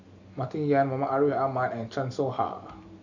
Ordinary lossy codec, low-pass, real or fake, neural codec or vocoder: none; 7.2 kHz; real; none